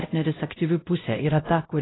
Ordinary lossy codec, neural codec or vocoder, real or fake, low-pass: AAC, 16 kbps; codec, 16 kHz, 0.5 kbps, X-Codec, WavLM features, trained on Multilingual LibriSpeech; fake; 7.2 kHz